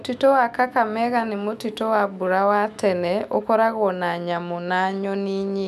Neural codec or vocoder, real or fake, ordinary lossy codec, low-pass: autoencoder, 48 kHz, 128 numbers a frame, DAC-VAE, trained on Japanese speech; fake; none; 14.4 kHz